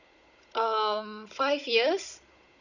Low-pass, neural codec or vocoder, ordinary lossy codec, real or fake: 7.2 kHz; codec, 16 kHz, 16 kbps, FunCodec, trained on Chinese and English, 50 frames a second; none; fake